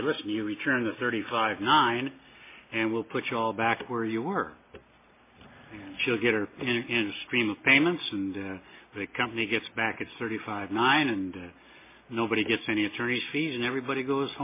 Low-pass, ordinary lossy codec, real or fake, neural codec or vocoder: 3.6 kHz; MP3, 24 kbps; real; none